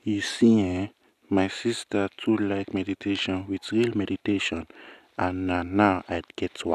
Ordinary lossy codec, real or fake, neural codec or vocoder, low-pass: none; real; none; 14.4 kHz